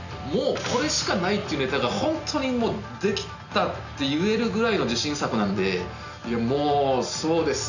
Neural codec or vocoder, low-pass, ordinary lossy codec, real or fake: none; 7.2 kHz; none; real